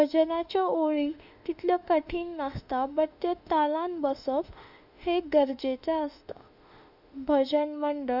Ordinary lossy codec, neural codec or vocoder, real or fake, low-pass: none; autoencoder, 48 kHz, 32 numbers a frame, DAC-VAE, trained on Japanese speech; fake; 5.4 kHz